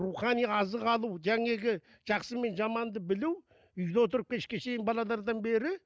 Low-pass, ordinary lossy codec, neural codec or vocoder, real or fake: none; none; none; real